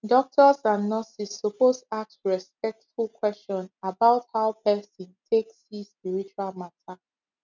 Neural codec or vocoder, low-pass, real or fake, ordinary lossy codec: none; 7.2 kHz; real; none